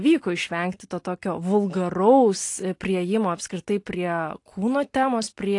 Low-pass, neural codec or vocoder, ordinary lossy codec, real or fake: 10.8 kHz; none; AAC, 48 kbps; real